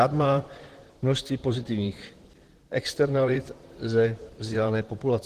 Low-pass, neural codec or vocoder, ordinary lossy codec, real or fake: 14.4 kHz; vocoder, 44.1 kHz, 128 mel bands, Pupu-Vocoder; Opus, 16 kbps; fake